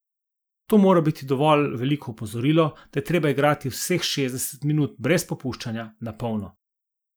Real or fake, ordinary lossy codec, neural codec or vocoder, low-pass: real; none; none; none